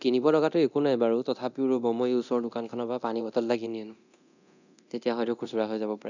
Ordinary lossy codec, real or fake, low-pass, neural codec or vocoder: none; fake; 7.2 kHz; codec, 24 kHz, 0.9 kbps, DualCodec